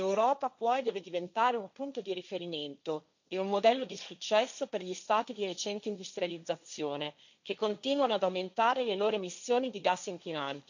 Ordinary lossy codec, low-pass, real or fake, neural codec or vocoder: none; 7.2 kHz; fake; codec, 16 kHz, 1.1 kbps, Voila-Tokenizer